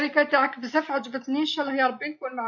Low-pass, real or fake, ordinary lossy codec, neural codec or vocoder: 7.2 kHz; real; MP3, 48 kbps; none